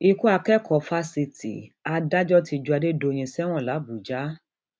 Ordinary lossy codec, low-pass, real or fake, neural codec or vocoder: none; none; real; none